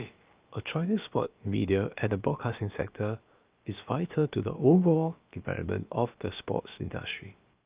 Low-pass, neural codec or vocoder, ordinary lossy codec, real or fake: 3.6 kHz; codec, 16 kHz, about 1 kbps, DyCAST, with the encoder's durations; Opus, 32 kbps; fake